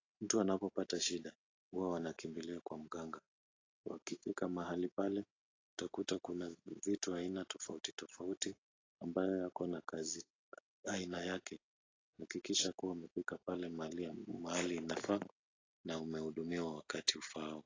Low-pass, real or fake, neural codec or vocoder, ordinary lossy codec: 7.2 kHz; real; none; AAC, 32 kbps